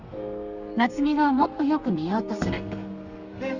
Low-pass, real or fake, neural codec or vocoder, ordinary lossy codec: 7.2 kHz; fake; codec, 32 kHz, 1.9 kbps, SNAC; Opus, 64 kbps